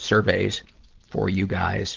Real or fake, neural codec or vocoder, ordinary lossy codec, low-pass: real; none; Opus, 16 kbps; 7.2 kHz